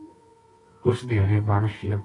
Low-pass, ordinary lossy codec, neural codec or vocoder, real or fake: 10.8 kHz; AAC, 32 kbps; codec, 24 kHz, 0.9 kbps, WavTokenizer, medium music audio release; fake